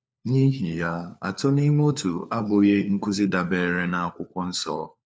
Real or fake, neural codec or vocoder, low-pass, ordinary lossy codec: fake; codec, 16 kHz, 4 kbps, FunCodec, trained on LibriTTS, 50 frames a second; none; none